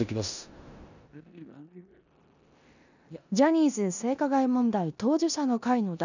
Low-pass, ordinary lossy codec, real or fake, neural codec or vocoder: 7.2 kHz; none; fake; codec, 16 kHz in and 24 kHz out, 0.9 kbps, LongCat-Audio-Codec, four codebook decoder